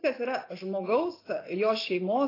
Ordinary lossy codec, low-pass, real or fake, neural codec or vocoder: AAC, 24 kbps; 5.4 kHz; real; none